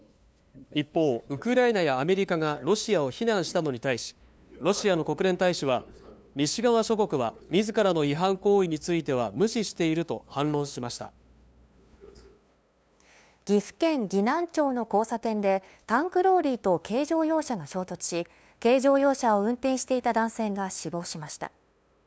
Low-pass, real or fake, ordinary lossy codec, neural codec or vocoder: none; fake; none; codec, 16 kHz, 2 kbps, FunCodec, trained on LibriTTS, 25 frames a second